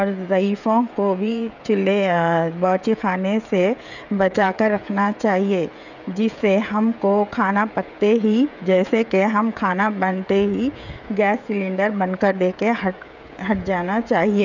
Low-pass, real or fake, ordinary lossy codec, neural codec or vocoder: 7.2 kHz; fake; none; codec, 16 kHz, 16 kbps, FreqCodec, smaller model